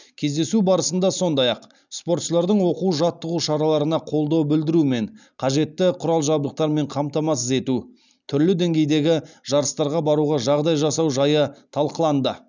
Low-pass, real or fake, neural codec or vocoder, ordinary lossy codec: 7.2 kHz; real; none; none